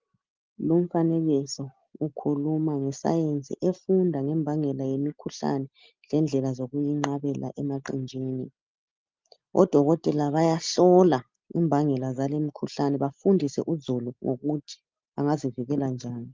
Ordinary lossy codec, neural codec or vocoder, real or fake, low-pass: Opus, 24 kbps; none; real; 7.2 kHz